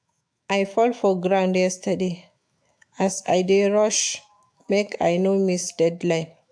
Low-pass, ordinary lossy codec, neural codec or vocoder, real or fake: 9.9 kHz; AAC, 64 kbps; autoencoder, 48 kHz, 128 numbers a frame, DAC-VAE, trained on Japanese speech; fake